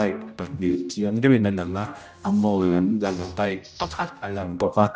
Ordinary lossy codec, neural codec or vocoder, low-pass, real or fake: none; codec, 16 kHz, 0.5 kbps, X-Codec, HuBERT features, trained on general audio; none; fake